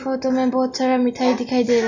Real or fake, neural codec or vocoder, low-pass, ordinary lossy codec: real; none; 7.2 kHz; none